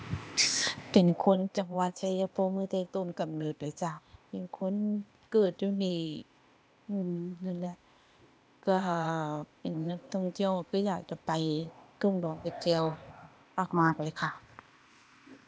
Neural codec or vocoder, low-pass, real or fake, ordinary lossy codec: codec, 16 kHz, 0.8 kbps, ZipCodec; none; fake; none